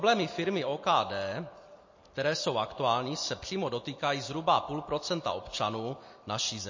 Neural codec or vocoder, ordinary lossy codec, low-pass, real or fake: none; MP3, 32 kbps; 7.2 kHz; real